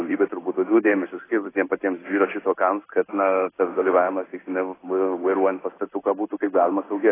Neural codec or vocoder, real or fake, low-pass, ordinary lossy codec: codec, 16 kHz in and 24 kHz out, 1 kbps, XY-Tokenizer; fake; 3.6 kHz; AAC, 16 kbps